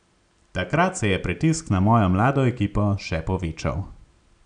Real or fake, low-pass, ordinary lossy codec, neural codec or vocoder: real; 9.9 kHz; none; none